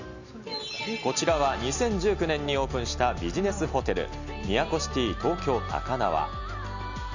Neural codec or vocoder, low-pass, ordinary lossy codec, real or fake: none; 7.2 kHz; none; real